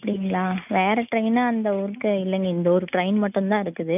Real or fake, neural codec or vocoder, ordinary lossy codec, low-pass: real; none; none; 3.6 kHz